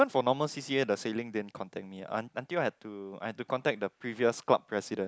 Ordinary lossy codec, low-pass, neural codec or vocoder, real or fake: none; none; none; real